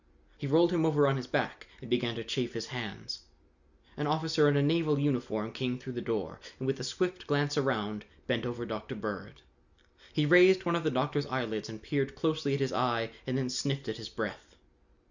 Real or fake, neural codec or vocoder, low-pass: real; none; 7.2 kHz